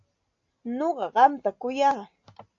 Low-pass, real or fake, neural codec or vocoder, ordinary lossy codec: 7.2 kHz; real; none; AAC, 48 kbps